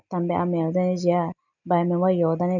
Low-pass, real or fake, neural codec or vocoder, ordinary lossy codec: 7.2 kHz; real; none; none